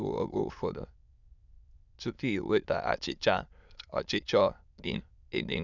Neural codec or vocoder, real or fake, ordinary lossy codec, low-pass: autoencoder, 22.05 kHz, a latent of 192 numbers a frame, VITS, trained on many speakers; fake; none; 7.2 kHz